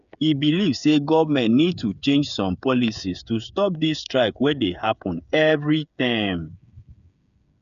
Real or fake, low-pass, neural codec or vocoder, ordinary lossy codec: fake; 7.2 kHz; codec, 16 kHz, 8 kbps, FreqCodec, smaller model; none